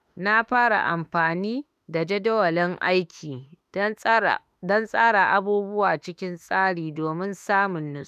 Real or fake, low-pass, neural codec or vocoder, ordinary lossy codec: fake; 14.4 kHz; autoencoder, 48 kHz, 32 numbers a frame, DAC-VAE, trained on Japanese speech; none